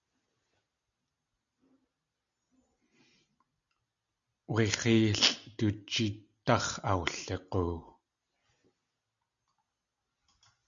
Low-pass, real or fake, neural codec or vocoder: 7.2 kHz; real; none